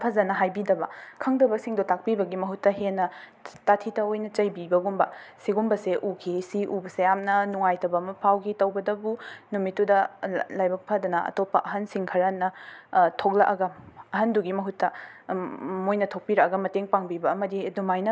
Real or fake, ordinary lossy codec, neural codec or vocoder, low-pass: real; none; none; none